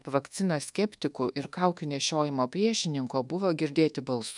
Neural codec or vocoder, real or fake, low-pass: codec, 24 kHz, 1.2 kbps, DualCodec; fake; 10.8 kHz